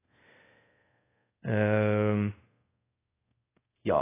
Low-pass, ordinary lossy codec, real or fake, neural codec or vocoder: 3.6 kHz; AAC, 16 kbps; fake; codec, 24 kHz, 0.5 kbps, DualCodec